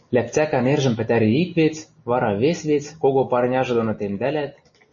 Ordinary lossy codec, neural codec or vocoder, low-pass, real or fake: MP3, 32 kbps; none; 7.2 kHz; real